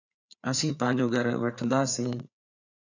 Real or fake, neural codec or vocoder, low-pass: fake; codec, 16 kHz, 4 kbps, FreqCodec, larger model; 7.2 kHz